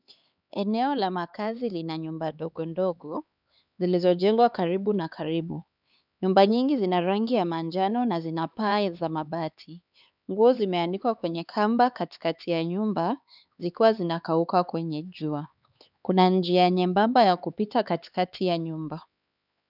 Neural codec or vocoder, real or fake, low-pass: codec, 16 kHz, 4 kbps, X-Codec, HuBERT features, trained on LibriSpeech; fake; 5.4 kHz